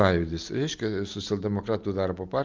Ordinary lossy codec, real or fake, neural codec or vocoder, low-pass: Opus, 24 kbps; real; none; 7.2 kHz